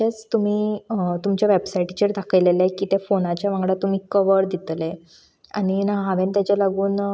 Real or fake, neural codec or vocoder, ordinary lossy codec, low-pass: real; none; none; none